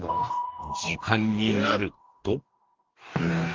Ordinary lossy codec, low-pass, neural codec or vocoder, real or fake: Opus, 32 kbps; 7.2 kHz; codec, 44.1 kHz, 2.6 kbps, DAC; fake